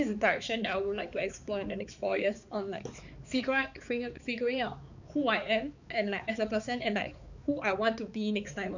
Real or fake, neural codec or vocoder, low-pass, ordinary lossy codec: fake; codec, 16 kHz, 4 kbps, X-Codec, HuBERT features, trained on balanced general audio; 7.2 kHz; none